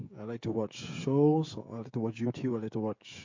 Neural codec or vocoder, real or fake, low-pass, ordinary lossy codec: codec, 16 kHz, 16 kbps, FreqCodec, smaller model; fake; 7.2 kHz; MP3, 48 kbps